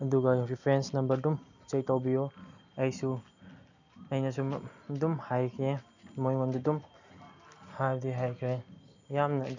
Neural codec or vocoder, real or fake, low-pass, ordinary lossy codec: none; real; 7.2 kHz; none